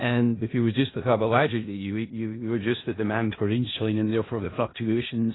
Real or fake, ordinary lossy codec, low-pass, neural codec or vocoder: fake; AAC, 16 kbps; 7.2 kHz; codec, 16 kHz in and 24 kHz out, 0.4 kbps, LongCat-Audio-Codec, four codebook decoder